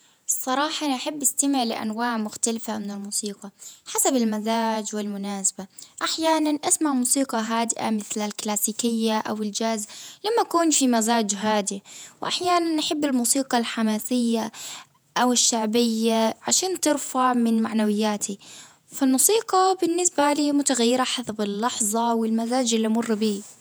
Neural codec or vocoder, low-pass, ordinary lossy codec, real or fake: vocoder, 48 kHz, 128 mel bands, Vocos; none; none; fake